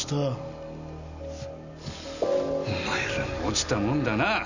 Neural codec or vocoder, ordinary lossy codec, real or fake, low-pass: none; none; real; 7.2 kHz